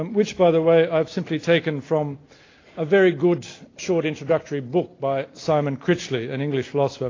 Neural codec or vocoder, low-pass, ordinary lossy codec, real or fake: none; 7.2 kHz; AAC, 32 kbps; real